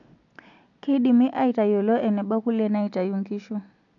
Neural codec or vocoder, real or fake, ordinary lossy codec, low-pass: none; real; none; 7.2 kHz